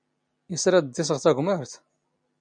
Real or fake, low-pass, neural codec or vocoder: real; 9.9 kHz; none